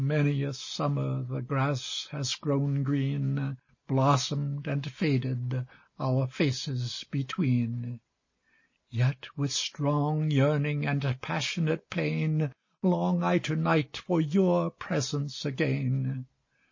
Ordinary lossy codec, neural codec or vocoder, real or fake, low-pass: MP3, 32 kbps; none; real; 7.2 kHz